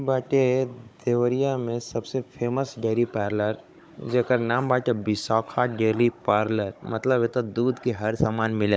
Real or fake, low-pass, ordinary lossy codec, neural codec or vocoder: fake; none; none; codec, 16 kHz, 16 kbps, FunCodec, trained on Chinese and English, 50 frames a second